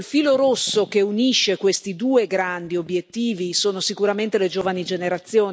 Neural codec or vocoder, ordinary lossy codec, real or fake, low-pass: none; none; real; none